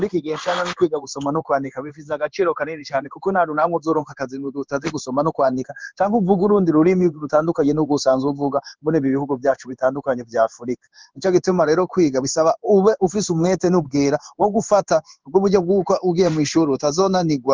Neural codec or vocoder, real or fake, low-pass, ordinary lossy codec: codec, 16 kHz in and 24 kHz out, 1 kbps, XY-Tokenizer; fake; 7.2 kHz; Opus, 32 kbps